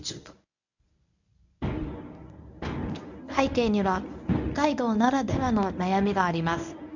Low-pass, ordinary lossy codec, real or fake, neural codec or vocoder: 7.2 kHz; none; fake; codec, 24 kHz, 0.9 kbps, WavTokenizer, medium speech release version 1